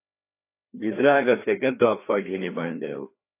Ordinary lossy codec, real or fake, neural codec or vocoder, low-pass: AAC, 24 kbps; fake; codec, 16 kHz, 2 kbps, FreqCodec, larger model; 3.6 kHz